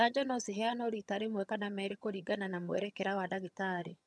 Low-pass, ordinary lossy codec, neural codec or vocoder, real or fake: none; none; vocoder, 22.05 kHz, 80 mel bands, HiFi-GAN; fake